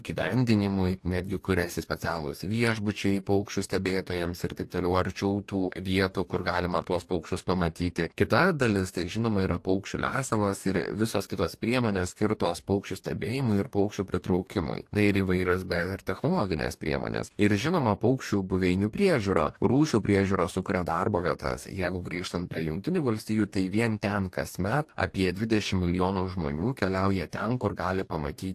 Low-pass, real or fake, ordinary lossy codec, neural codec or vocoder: 14.4 kHz; fake; AAC, 64 kbps; codec, 44.1 kHz, 2.6 kbps, DAC